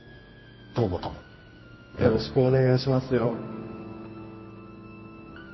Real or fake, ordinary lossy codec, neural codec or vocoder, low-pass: fake; MP3, 24 kbps; codec, 24 kHz, 0.9 kbps, WavTokenizer, medium music audio release; 7.2 kHz